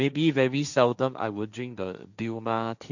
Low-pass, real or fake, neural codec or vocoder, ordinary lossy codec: 7.2 kHz; fake; codec, 16 kHz, 1.1 kbps, Voila-Tokenizer; none